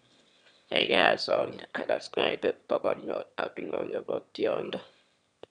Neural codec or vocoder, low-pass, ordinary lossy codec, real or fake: autoencoder, 22.05 kHz, a latent of 192 numbers a frame, VITS, trained on one speaker; 9.9 kHz; none; fake